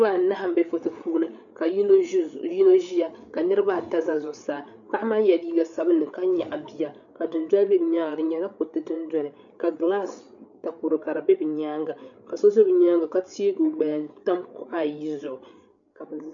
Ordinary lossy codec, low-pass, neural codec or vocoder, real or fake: MP3, 96 kbps; 7.2 kHz; codec, 16 kHz, 8 kbps, FreqCodec, larger model; fake